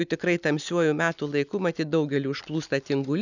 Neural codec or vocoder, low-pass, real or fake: none; 7.2 kHz; real